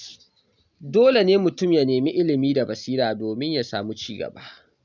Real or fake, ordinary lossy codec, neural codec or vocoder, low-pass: real; none; none; 7.2 kHz